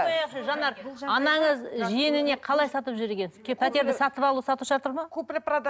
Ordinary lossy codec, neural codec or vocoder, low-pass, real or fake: none; none; none; real